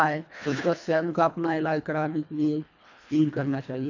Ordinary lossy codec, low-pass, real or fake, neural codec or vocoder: none; 7.2 kHz; fake; codec, 24 kHz, 1.5 kbps, HILCodec